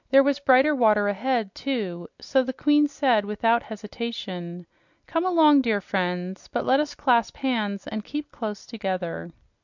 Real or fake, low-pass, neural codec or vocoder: real; 7.2 kHz; none